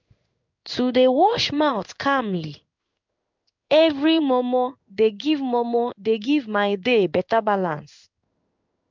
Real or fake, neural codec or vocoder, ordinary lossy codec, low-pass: fake; codec, 16 kHz in and 24 kHz out, 1 kbps, XY-Tokenizer; MP3, 64 kbps; 7.2 kHz